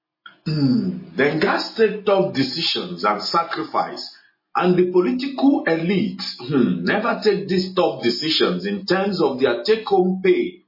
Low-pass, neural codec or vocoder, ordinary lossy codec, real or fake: 5.4 kHz; none; MP3, 24 kbps; real